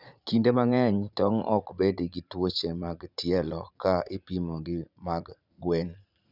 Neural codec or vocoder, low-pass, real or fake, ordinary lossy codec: vocoder, 44.1 kHz, 80 mel bands, Vocos; 5.4 kHz; fake; none